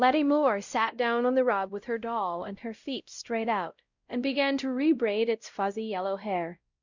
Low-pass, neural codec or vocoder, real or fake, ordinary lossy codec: 7.2 kHz; codec, 16 kHz, 0.5 kbps, X-Codec, WavLM features, trained on Multilingual LibriSpeech; fake; Opus, 64 kbps